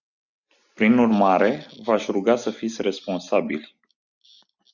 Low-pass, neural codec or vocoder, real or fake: 7.2 kHz; none; real